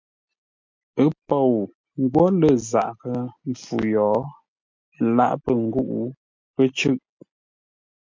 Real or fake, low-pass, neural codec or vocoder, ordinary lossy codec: real; 7.2 kHz; none; MP3, 48 kbps